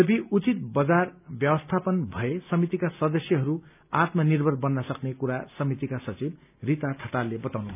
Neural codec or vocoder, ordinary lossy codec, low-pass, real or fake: none; none; 3.6 kHz; real